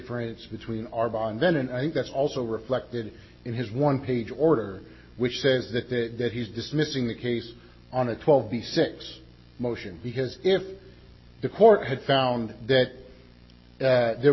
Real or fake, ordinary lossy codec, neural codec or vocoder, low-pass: real; MP3, 24 kbps; none; 7.2 kHz